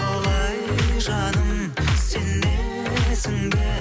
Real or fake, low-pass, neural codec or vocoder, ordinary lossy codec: real; none; none; none